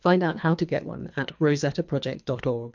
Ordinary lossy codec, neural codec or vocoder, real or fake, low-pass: MP3, 64 kbps; codec, 24 kHz, 3 kbps, HILCodec; fake; 7.2 kHz